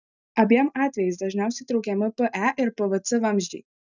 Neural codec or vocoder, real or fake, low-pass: none; real; 7.2 kHz